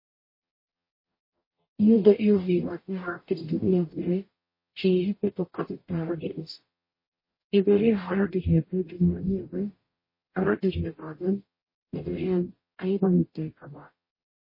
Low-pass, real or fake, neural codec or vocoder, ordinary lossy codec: 5.4 kHz; fake; codec, 44.1 kHz, 0.9 kbps, DAC; MP3, 24 kbps